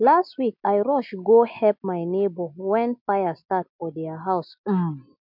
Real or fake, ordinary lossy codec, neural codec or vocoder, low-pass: real; MP3, 48 kbps; none; 5.4 kHz